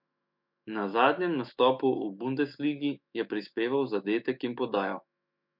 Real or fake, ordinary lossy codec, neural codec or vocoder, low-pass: fake; MP3, 48 kbps; autoencoder, 48 kHz, 128 numbers a frame, DAC-VAE, trained on Japanese speech; 5.4 kHz